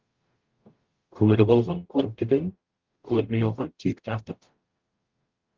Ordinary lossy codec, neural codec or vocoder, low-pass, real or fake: Opus, 24 kbps; codec, 44.1 kHz, 0.9 kbps, DAC; 7.2 kHz; fake